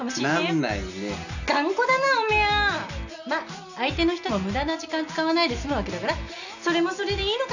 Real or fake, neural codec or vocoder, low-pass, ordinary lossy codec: real; none; 7.2 kHz; none